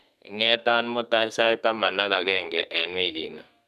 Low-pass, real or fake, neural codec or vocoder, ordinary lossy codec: 14.4 kHz; fake; codec, 32 kHz, 1.9 kbps, SNAC; none